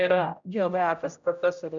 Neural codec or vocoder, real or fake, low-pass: codec, 16 kHz, 0.5 kbps, X-Codec, HuBERT features, trained on general audio; fake; 7.2 kHz